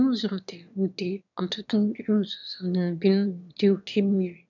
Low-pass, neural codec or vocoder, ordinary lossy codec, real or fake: 7.2 kHz; autoencoder, 22.05 kHz, a latent of 192 numbers a frame, VITS, trained on one speaker; none; fake